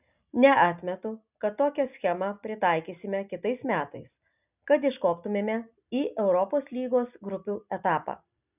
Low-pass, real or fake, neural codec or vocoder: 3.6 kHz; real; none